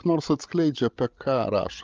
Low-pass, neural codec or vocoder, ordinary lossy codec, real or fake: 7.2 kHz; codec, 16 kHz, 16 kbps, FreqCodec, larger model; Opus, 24 kbps; fake